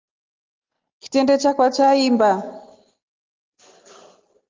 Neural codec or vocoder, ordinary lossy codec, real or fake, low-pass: none; Opus, 32 kbps; real; 7.2 kHz